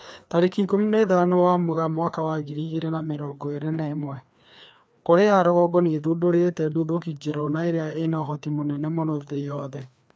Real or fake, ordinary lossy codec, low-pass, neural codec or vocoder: fake; none; none; codec, 16 kHz, 2 kbps, FreqCodec, larger model